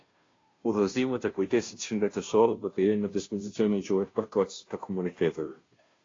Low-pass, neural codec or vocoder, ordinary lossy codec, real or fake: 7.2 kHz; codec, 16 kHz, 0.5 kbps, FunCodec, trained on Chinese and English, 25 frames a second; AAC, 32 kbps; fake